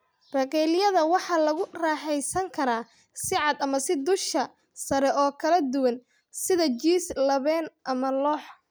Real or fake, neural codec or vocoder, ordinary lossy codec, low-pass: real; none; none; none